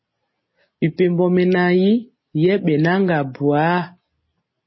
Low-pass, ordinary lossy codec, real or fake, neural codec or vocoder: 7.2 kHz; MP3, 24 kbps; real; none